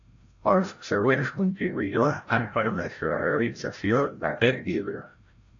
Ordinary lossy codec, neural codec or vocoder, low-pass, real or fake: AAC, 48 kbps; codec, 16 kHz, 0.5 kbps, FreqCodec, larger model; 7.2 kHz; fake